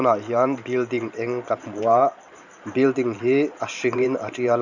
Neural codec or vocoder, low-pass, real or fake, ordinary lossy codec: vocoder, 44.1 kHz, 128 mel bands every 512 samples, BigVGAN v2; 7.2 kHz; fake; none